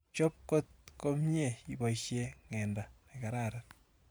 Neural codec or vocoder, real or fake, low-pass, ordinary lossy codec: vocoder, 44.1 kHz, 128 mel bands every 512 samples, BigVGAN v2; fake; none; none